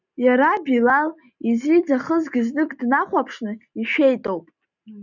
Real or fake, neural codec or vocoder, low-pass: real; none; 7.2 kHz